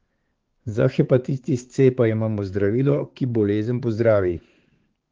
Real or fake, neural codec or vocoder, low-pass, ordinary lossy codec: fake; codec, 16 kHz, 4 kbps, X-Codec, HuBERT features, trained on balanced general audio; 7.2 kHz; Opus, 16 kbps